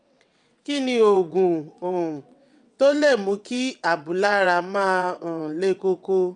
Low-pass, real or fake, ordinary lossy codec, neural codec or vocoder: 9.9 kHz; fake; none; vocoder, 22.05 kHz, 80 mel bands, WaveNeXt